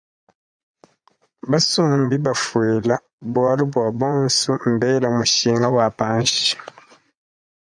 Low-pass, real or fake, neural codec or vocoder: 9.9 kHz; fake; vocoder, 22.05 kHz, 80 mel bands, Vocos